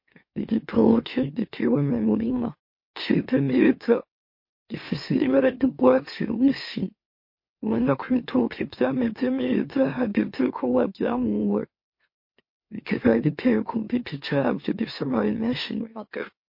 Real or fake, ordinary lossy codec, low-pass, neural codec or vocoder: fake; MP3, 32 kbps; 5.4 kHz; autoencoder, 44.1 kHz, a latent of 192 numbers a frame, MeloTTS